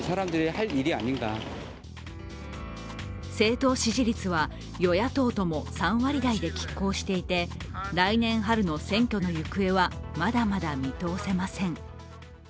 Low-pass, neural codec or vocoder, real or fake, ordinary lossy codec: none; none; real; none